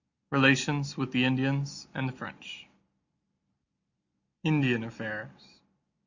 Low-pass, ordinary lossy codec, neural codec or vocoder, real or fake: 7.2 kHz; Opus, 64 kbps; none; real